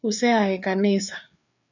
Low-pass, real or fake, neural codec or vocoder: 7.2 kHz; fake; codec, 16 kHz, 4 kbps, FreqCodec, larger model